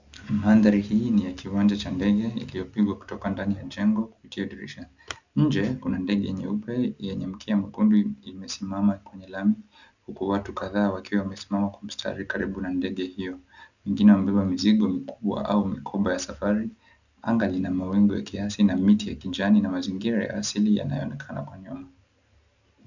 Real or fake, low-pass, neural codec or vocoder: real; 7.2 kHz; none